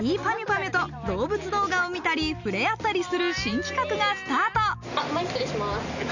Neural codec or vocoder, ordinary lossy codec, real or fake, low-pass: none; none; real; 7.2 kHz